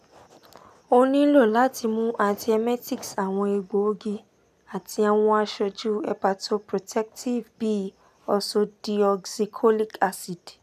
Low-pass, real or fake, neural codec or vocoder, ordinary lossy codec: 14.4 kHz; real; none; none